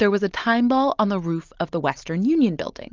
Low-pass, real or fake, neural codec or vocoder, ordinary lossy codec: 7.2 kHz; real; none; Opus, 32 kbps